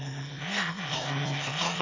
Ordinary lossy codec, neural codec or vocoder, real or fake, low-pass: MP3, 48 kbps; autoencoder, 22.05 kHz, a latent of 192 numbers a frame, VITS, trained on one speaker; fake; 7.2 kHz